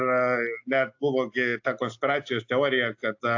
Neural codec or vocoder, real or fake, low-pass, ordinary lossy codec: none; real; 7.2 kHz; AAC, 48 kbps